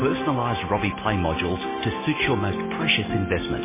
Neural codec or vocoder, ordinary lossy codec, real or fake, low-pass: none; MP3, 16 kbps; real; 3.6 kHz